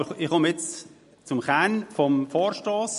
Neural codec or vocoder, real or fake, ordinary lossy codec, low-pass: none; real; MP3, 48 kbps; 14.4 kHz